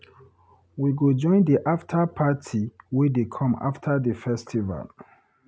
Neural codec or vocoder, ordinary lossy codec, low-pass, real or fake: none; none; none; real